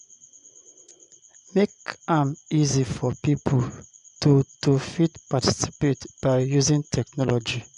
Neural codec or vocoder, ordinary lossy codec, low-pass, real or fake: vocoder, 24 kHz, 100 mel bands, Vocos; none; 10.8 kHz; fake